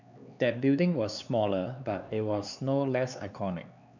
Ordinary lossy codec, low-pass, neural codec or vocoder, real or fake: none; 7.2 kHz; codec, 16 kHz, 4 kbps, X-Codec, HuBERT features, trained on LibriSpeech; fake